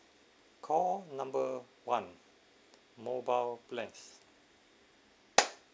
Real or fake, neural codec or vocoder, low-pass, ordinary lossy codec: real; none; none; none